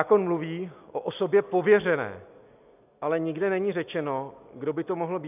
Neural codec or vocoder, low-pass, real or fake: none; 3.6 kHz; real